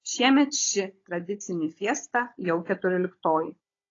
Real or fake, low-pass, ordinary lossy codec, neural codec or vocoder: fake; 7.2 kHz; AAC, 32 kbps; codec, 16 kHz, 16 kbps, FunCodec, trained on Chinese and English, 50 frames a second